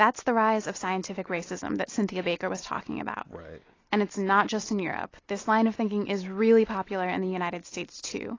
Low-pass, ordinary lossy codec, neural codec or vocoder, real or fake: 7.2 kHz; AAC, 32 kbps; none; real